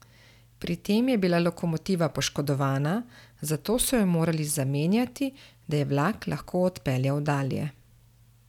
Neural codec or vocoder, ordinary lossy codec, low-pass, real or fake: none; none; 19.8 kHz; real